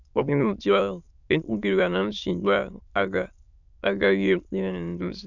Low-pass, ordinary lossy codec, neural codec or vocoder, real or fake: 7.2 kHz; none; autoencoder, 22.05 kHz, a latent of 192 numbers a frame, VITS, trained on many speakers; fake